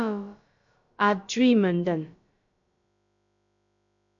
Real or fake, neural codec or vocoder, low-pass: fake; codec, 16 kHz, about 1 kbps, DyCAST, with the encoder's durations; 7.2 kHz